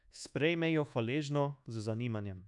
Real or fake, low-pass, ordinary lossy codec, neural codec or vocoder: fake; 10.8 kHz; none; codec, 24 kHz, 1.2 kbps, DualCodec